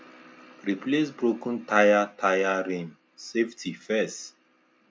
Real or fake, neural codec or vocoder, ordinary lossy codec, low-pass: real; none; none; none